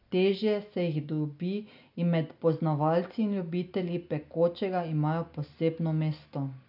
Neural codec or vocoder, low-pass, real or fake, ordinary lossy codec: none; 5.4 kHz; real; none